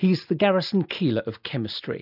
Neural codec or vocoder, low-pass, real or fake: none; 5.4 kHz; real